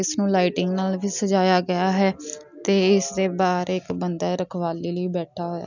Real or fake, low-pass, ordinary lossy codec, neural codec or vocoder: real; 7.2 kHz; none; none